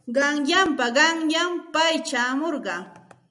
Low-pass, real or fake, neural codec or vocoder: 10.8 kHz; real; none